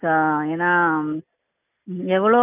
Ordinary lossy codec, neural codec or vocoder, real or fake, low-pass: none; none; real; 3.6 kHz